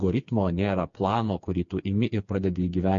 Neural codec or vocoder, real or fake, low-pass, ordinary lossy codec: codec, 16 kHz, 4 kbps, FreqCodec, smaller model; fake; 7.2 kHz; MP3, 48 kbps